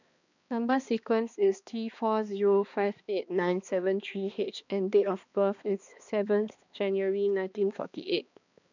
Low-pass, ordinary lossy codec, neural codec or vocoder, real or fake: 7.2 kHz; none; codec, 16 kHz, 2 kbps, X-Codec, HuBERT features, trained on balanced general audio; fake